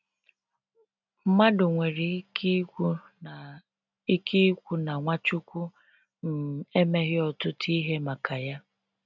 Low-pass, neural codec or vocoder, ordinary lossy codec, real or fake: 7.2 kHz; none; none; real